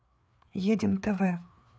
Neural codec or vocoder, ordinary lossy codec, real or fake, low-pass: codec, 16 kHz, 4 kbps, FreqCodec, larger model; none; fake; none